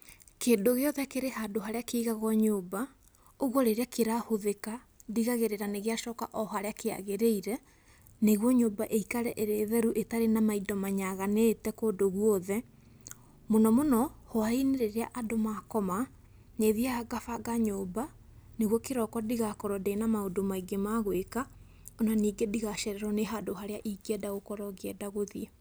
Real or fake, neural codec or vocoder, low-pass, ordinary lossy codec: real; none; none; none